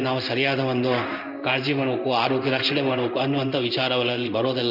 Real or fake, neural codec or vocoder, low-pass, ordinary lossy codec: fake; codec, 16 kHz in and 24 kHz out, 1 kbps, XY-Tokenizer; 5.4 kHz; none